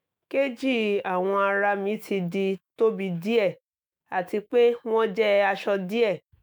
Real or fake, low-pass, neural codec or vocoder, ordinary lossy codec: fake; none; autoencoder, 48 kHz, 128 numbers a frame, DAC-VAE, trained on Japanese speech; none